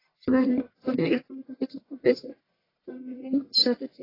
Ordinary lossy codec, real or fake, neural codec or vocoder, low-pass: AAC, 24 kbps; fake; codec, 44.1 kHz, 1.7 kbps, Pupu-Codec; 5.4 kHz